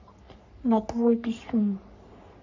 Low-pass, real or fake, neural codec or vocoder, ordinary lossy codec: 7.2 kHz; fake; codec, 44.1 kHz, 3.4 kbps, Pupu-Codec; none